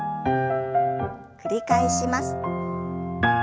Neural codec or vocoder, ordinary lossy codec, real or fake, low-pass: none; none; real; none